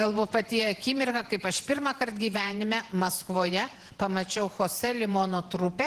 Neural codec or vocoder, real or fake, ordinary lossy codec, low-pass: vocoder, 48 kHz, 128 mel bands, Vocos; fake; Opus, 16 kbps; 14.4 kHz